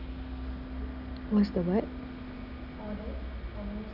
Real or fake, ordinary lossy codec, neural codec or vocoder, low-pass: real; none; none; 5.4 kHz